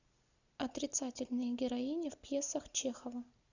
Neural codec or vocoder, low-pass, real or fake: none; 7.2 kHz; real